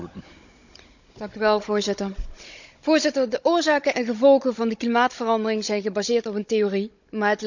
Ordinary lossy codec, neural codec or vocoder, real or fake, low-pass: none; codec, 16 kHz, 16 kbps, FunCodec, trained on Chinese and English, 50 frames a second; fake; 7.2 kHz